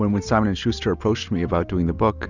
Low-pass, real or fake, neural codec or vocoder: 7.2 kHz; real; none